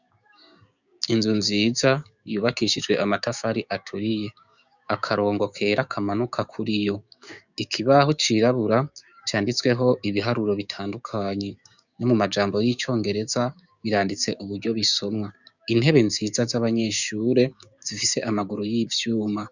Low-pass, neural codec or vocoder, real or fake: 7.2 kHz; codec, 16 kHz, 6 kbps, DAC; fake